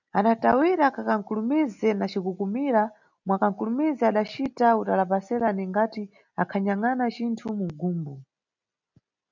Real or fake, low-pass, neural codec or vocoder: real; 7.2 kHz; none